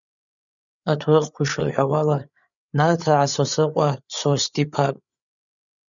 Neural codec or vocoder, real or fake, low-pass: codec, 16 kHz, 8 kbps, FreqCodec, larger model; fake; 7.2 kHz